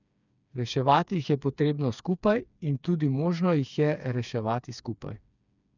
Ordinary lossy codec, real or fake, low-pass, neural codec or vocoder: none; fake; 7.2 kHz; codec, 16 kHz, 4 kbps, FreqCodec, smaller model